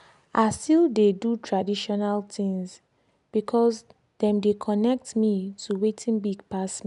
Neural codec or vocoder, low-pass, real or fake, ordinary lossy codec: none; 10.8 kHz; real; none